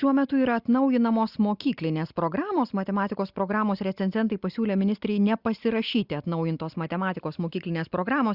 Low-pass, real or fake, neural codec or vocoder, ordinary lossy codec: 5.4 kHz; real; none; Opus, 64 kbps